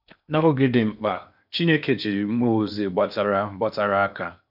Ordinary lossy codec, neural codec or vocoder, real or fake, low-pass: none; codec, 16 kHz in and 24 kHz out, 0.8 kbps, FocalCodec, streaming, 65536 codes; fake; 5.4 kHz